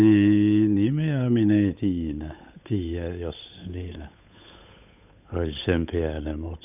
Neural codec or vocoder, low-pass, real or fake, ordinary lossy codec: codec, 16 kHz, 8 kbps, FunCodec, trained on Chinese and English, 25 frames a second; 3.6 kHz; fake; none